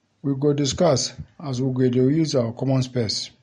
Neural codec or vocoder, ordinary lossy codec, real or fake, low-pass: none; MP3, 48 kbps; real; 19.8 kHz